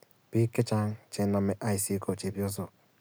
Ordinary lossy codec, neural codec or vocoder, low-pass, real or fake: none; none; none; real